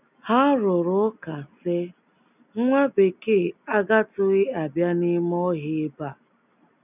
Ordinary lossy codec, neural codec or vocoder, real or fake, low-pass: none; none; real; 3.6 kHz